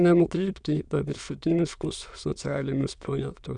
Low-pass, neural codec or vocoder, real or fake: 9.9 kHz; autoencoder, 22.05 kHz, a latent of 192 numbers a frame, VITS, trained on many speakers; fake